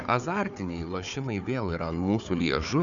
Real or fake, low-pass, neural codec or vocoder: fake; 7.2 kHz; codec, 16 kHz, 4 kbps, FunCodec, trained on Chinese and English, 50 frames a second